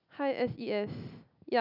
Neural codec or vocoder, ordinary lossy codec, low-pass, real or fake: none; none; 5.4 kHz; real